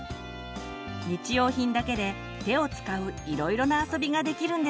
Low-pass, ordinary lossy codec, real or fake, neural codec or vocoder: none; none; real; none